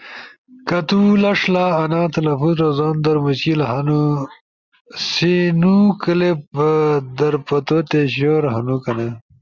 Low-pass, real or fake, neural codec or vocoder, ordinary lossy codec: 7.2 kHz; real; none; Opus, 64 kbps